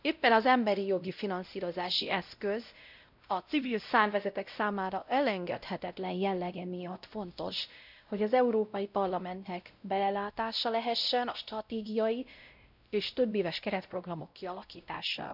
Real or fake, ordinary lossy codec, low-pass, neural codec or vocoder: fake; none; 5.4 kHz; codec, 16 kHz, 0.5 kbps, X-Codec, WavLM features, trained on Multilingual LibriSpeech